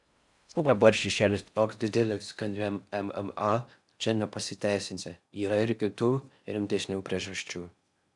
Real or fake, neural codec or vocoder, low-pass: fake; codec, 16 kHz in and 24 kHz out, 0.6 kbps, FocalCodec, streaming, 4096 codes; 10.8 kHz